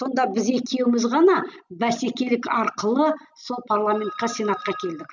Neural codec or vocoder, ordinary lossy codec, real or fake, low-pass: none; none; real; 7.2 kHz